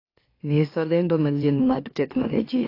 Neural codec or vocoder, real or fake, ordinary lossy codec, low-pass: autoencoder, 44.1 kHz, a latent of 192 numbers a frame, MeloTTS; fake; AAC, 32 kbps; 5.4 kHz